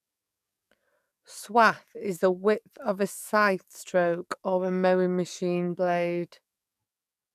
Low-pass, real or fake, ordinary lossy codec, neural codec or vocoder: 14.4 kHz; fake; none; codec, 44.1 kHz, 7.8 kbps, DAC